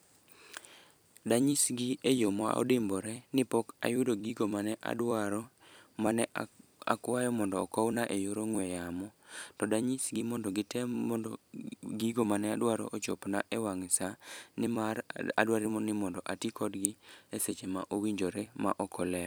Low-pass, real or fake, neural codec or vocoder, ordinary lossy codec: none; fake; vocoder, 44.1 kHz, 128 mel bands every 256 samples, BigVGAN v2; none